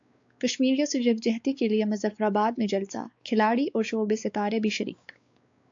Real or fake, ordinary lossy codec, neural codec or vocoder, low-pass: fake; AAC, 64 kbps; codec, 16 kHz, 4 kbps, X-Codec, WavLM features, trained on Multilingual LibriSpeech; 7.2 kHz